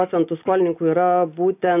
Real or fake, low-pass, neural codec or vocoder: real; 3.6 kHz; none